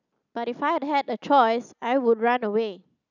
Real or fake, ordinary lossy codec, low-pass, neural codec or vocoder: real; none; 7.2 kHz; none